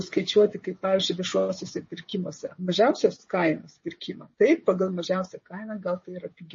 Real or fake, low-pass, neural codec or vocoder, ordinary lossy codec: fake; 7.2 kHz; codec, 16 kHz, 16 kbps, FreqCodec, smaller model; MP3, 32 kbps